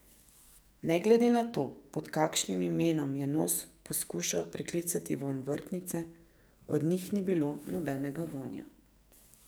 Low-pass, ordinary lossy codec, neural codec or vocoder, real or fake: none; none; codec, 44.1 kHz, 2.6 kbps, SNAC; fake